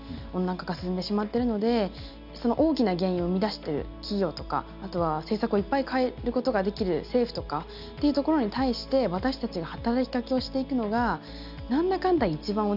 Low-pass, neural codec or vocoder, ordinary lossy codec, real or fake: 5.4 kHz; none; none; real